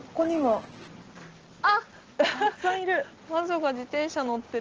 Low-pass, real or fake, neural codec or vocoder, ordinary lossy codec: 7.2 kHz; real; none; Opus, 16 kbps